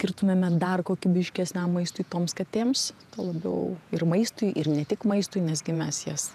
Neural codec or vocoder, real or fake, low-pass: vocoder, 48 kHz, 128 mel bands, Vocos; fake; 14.4 kHz